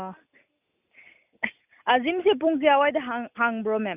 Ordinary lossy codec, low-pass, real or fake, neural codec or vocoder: none; 3.6 kHz; real; none